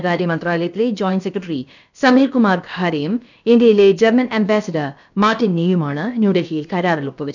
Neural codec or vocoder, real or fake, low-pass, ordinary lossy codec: codec, 16 kHz, about 1 kbps, DyCAST, with the encoder's durations; fake; 7.2 kHz; none